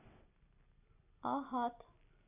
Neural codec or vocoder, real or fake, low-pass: none; real; 3.6 kHz